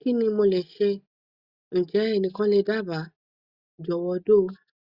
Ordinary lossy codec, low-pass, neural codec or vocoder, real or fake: Opus, 64 kbps; 5.4 kHz; none; real